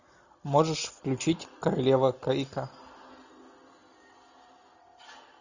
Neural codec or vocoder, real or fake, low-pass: none; real; 7.2 kHz